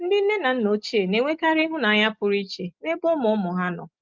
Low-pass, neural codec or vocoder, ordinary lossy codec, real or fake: 7.2 kHz; none; Opus, 32 kbps; real